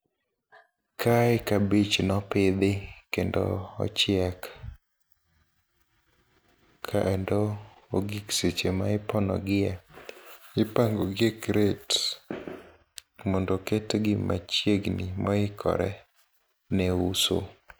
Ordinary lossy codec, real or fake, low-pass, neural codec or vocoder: none; real; none; none